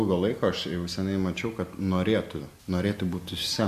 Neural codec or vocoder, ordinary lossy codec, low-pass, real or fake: none; AAC, 96 kbps; 14.4 kHz; real